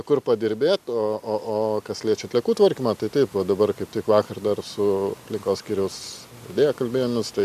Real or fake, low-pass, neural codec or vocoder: real; 14.4 kHz; none